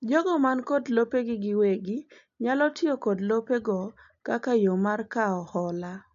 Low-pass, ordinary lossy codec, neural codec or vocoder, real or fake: 7.2 kHz; AAC, 64 kbps; none; real